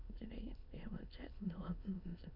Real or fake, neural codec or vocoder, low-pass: fake; autoencoder, 22.05 kHz, a latent of 192 numbers a frame, VITS, trained on many speakers; 5.4 kHz